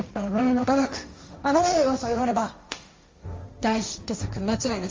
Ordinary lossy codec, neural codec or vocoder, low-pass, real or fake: Opus, 32 kbps; codec, 16 kHz, 1.1 kbps, Voila-Tokenizer; 7.2 kHz; fake